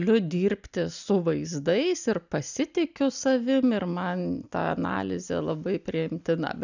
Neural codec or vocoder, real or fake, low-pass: none; real; 7.2 kHz